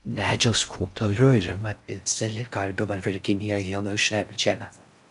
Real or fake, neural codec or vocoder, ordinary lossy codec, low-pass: fake; codec, 16 kHz in and 24 kHz out, 0.6 kbps, FocalCodec, streaming, 4096 codes; AAC, 96 kbps; 10.8 kHz